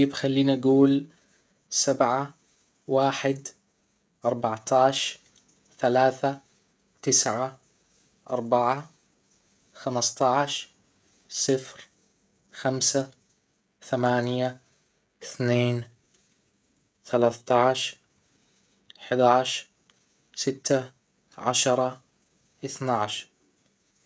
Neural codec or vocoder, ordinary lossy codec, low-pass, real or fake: codec, 16 kHz, 16 kbps, FreqCodec, smaller model; none; none; fake